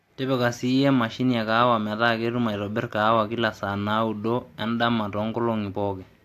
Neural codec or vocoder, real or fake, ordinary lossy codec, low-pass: none; real; AAC, 64 kbps; 14.4 kHz